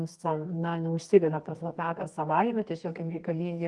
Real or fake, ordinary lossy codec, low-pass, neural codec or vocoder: fake; Opus, 24 kbps; 10.8 kHz; codec, 24 kHz, 0.9 kbps, WavTokenizer, medium music audio release